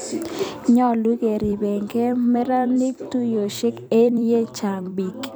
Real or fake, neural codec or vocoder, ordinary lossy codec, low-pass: fake; vocoder, 44.1 kHz, 128 mel bands every 256 samples, BigVGAN v2; none; none